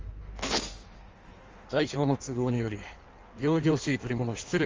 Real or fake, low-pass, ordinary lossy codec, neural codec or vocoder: fake; 7.2 kHz; Opus, 32 kbps; codec, 16 kHz in and 24 kHz out, 1.1 kbps, FireRedTTS-2 codec